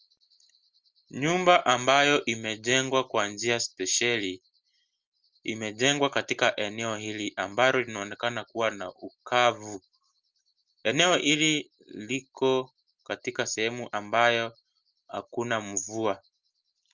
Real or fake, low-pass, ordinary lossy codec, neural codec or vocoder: real; 7.2 kHz; Opus, 32 kbps; none